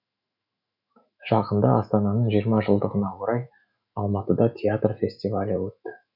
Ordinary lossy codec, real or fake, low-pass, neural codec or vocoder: none; fake; 5.4 kHz; autoencoder, 48 kHz, 128 numbers a frame, DAC-VAE, trained on Japanese speech